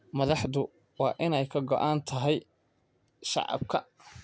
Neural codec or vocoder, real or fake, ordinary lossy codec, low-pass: none; real; none; none